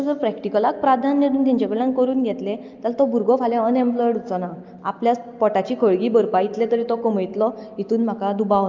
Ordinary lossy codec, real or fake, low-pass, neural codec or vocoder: Opus, 24 kbps; real; 7.2 kHz; none